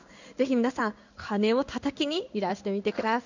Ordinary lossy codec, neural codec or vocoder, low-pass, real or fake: none; codec, 16 kHz in and 24 kHz out, 1 kbps, XY-Tokenizer; 7.2 kHz; fake